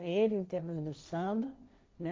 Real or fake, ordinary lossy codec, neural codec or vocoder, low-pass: fake; none; codec, 16 kHz, 1.1 kbps, Voila-Tokenizer; none